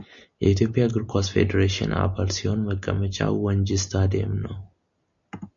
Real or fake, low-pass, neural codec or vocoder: real; 7.2 kHz; none